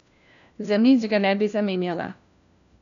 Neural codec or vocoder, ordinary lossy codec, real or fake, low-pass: codec, 16 kHz, 1 kbps, FunCodec, trained on LibriTTS, 50 frames a second; none; fake; 7.2 kHz